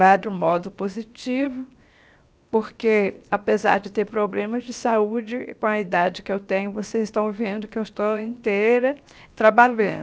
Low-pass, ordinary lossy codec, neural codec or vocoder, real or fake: none; none; codec, 16 kHz, 0.7 kbps, FocalCodec; fake